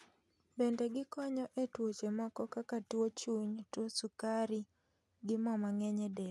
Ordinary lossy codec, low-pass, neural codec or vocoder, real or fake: none; none; none; real